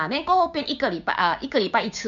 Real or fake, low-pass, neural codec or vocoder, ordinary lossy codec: fake; 7.2 kHz; codec, 16 kHz, 6 kbps, DAC; MP3, 96 kbps